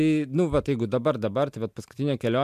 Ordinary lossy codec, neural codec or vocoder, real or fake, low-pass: MP3, 96 kbps; none; real; 14.4 kHz